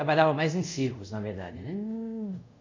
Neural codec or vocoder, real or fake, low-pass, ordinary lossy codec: codec, 24 kHz, 0.5 kbps, DualCodec; fake; 7.2 kHz; MP3, 48 kbps